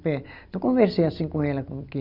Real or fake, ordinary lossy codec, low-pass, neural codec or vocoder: real; none; 5.4 kHz; none